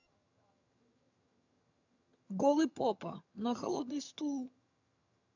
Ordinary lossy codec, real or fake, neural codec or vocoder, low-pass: none; fake; vocoder, 22.05 kHz, 80 mel bands, HiFi-GAN; 7.2 kHz